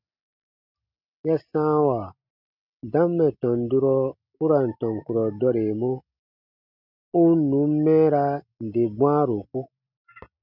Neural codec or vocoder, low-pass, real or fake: none; 5.4 kHz; real